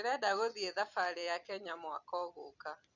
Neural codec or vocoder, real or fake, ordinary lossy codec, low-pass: vocoder, 44.1 kHz, 128 mel bands every 256 samples, BigVGAN v2; fake; none; 7.2 kHz